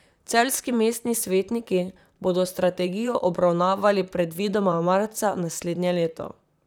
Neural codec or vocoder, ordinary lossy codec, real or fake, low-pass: vocoder, 44.1 kHz, 128 mel bands, Pupu-Vocoder; none; fake; none